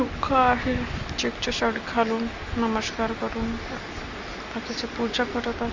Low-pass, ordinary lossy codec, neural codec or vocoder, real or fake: 7.2 kHz; Opus, 32 kbps; none; real